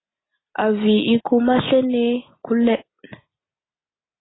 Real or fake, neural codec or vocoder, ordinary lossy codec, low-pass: real; none; AAC, 16 kbps; 7.2 kHz